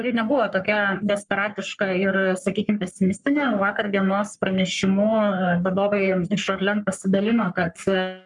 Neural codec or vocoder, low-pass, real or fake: codec, 44.1 kHz, 3.4 kbps, Pupu-Codec; 10.8 kHz; fake